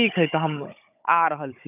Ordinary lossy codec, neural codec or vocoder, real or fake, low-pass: none; codec, 16 kHz, 16 kbps, FunCodec, trained on Chinese and English, 50 frames a second; fake; 3.6 kHz